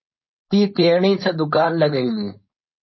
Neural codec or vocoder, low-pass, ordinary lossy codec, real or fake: codec, 16 kHz, 4.8 kbps, FACodec; 7.2 kHz; MP3, 24 kbps; fake